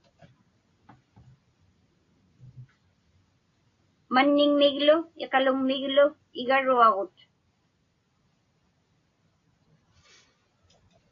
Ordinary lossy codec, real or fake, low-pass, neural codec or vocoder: AAC, 32 kbps; real; 7.2 kHz; none